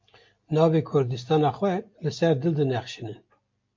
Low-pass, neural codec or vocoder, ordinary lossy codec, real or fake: 7.2 kHz; none; MP3, 48 kbps; real